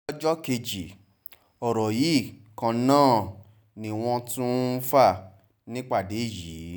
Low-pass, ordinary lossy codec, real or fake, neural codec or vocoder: none; none; real; none